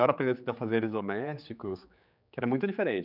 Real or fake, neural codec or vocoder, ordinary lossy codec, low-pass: fake; codec, 16 kHz, 4 kbps, X-Codec, HuBERT features, trained on general audio; none; 5.4 kHz